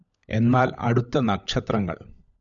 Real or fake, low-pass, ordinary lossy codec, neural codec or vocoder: fake; 7.2 kHz; MP3, 96 kbps; codec, 16 kHz, 16 kbps, FunCodec, trained on LibriTTS, 50 frames a second